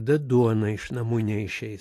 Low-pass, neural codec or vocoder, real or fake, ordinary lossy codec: 14.4 kHz; none; real; AAC, 48 kbps